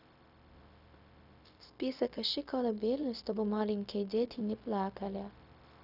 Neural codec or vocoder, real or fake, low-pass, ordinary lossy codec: codec, 16 kHz, 0.4 kbps, LongCat-Audio-Codec; fake; 5.4 kHz; none